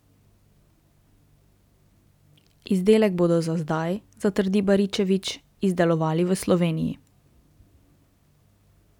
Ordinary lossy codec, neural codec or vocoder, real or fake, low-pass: none; none; real; 19.8 kHz